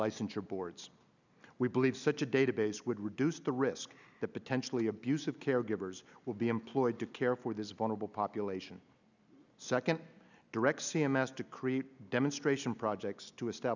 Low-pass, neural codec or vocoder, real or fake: 7.2 kHz; none; real